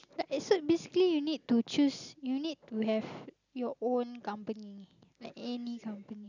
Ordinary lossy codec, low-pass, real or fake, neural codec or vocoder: none; 7.2 kHz; real; none